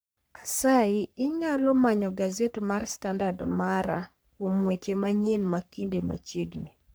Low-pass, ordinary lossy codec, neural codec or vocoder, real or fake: none; none; codec, 44.1 kHz, 3.4 kbps, Pupu-Codec; fake